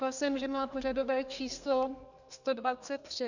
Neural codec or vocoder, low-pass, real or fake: codec, 32 kHz, 1.9 kbps, SNAC; 7.2 kHz; fake